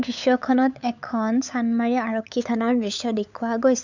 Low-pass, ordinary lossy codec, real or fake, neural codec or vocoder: 7.2 kHz; none; fake; codec, 16 kHz, 8 kbps, FunCodec, trained on LibriTTS, 25 frames a second